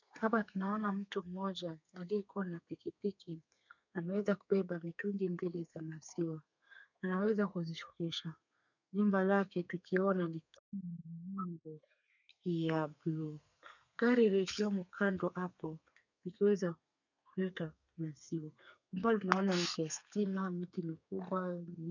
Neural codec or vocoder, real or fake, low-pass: codec, 32 kHz, 1.9 kbps, SNAC; fake; 7.2 kHz